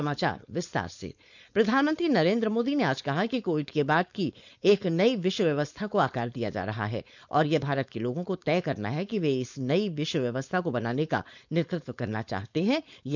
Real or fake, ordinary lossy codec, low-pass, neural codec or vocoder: fake; none; 7.2 kHz; codec, 16 kHz, 4.8 kbps, FACodec